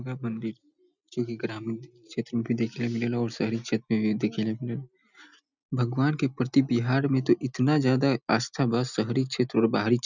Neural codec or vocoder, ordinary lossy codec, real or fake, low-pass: none; none; real; 7.2 kHz